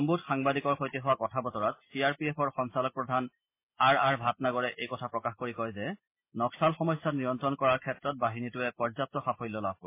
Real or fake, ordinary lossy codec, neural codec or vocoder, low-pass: real; MP3, 24 kbps; none; 3.6 kHz